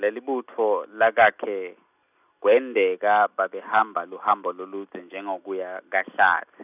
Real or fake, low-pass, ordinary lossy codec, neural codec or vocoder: real; 3.6 kHz; none; none